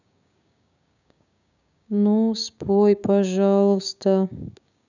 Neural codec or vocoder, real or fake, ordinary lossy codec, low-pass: codec, 16 kHz, 6 kbps, DAC; fake; none; 7.2 kHz